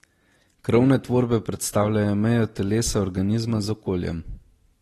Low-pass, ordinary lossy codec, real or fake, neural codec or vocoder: 19.8 kHz; AAC, 32 kbps; real; none